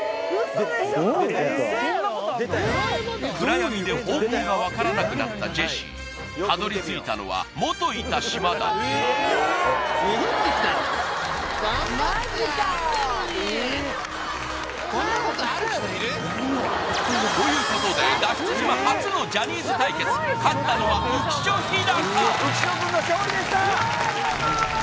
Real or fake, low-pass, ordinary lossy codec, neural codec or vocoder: real; none; none; none